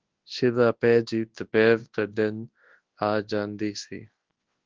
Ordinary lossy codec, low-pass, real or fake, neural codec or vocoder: Opus, 16 kbps; 7.2 kHz; fake; codec, 24 kHz, 0.9 kbps, WavTokenizer, large speech release